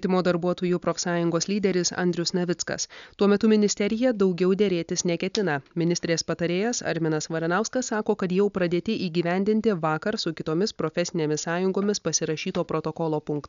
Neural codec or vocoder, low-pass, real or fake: none; 7.2 kHz; real